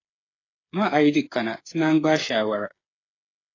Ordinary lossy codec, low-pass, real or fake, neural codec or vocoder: AAC, 32 kbps; 7.2 kHz; fake; codec, 16 kHz, 8 kbps, FreqCodec, smaller model